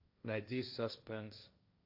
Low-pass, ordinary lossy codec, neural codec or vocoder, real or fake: 5.4 kHz; AAC, 48 kbps; codec, 16 kHz, 1.1 kbps, Voila-Tokenizer; fake